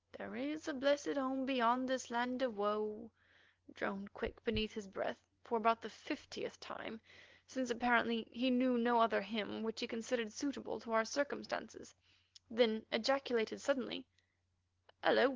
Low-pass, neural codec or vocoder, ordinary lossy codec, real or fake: 7.2 kHz; none; Opus, 16 kbps; real